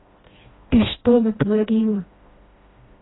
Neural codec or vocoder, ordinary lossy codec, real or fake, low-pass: codec, 16 kHz, 1 kbps, FreqCodec, smaller model; AAC, 16 kbps; fake; 7.2 kHz